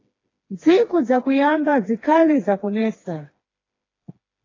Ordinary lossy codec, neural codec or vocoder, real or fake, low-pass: AAC, 32 kbps; codec, 16 kHz, 2 kbps, FreqCodec, smaller model; fake; 7.2 kHz